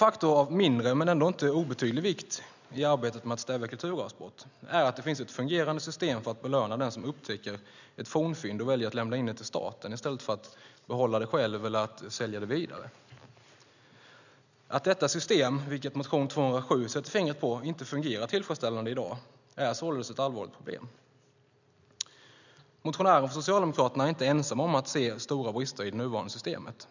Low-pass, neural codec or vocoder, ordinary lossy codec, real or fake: 7.2 kHz; none; none; real